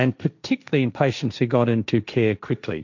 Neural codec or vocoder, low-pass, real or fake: codec, 16 kHz, 1.1 kbps, Voila-Tokenizer; 7.2 kHz; fake